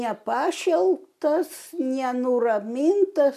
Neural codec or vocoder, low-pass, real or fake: none; 14.4 kHz; real